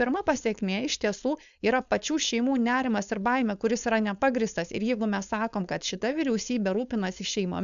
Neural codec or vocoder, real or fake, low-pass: codec, 16 kHz, 4.8 kbps, FACodec; fake; 7.2 kHz